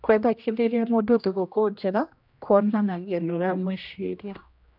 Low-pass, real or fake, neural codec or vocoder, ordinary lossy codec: 5.4 kHz; fake; codec, 16 kHz, 1 kbps, X-Codec, HuBERT features, trained on general audio; none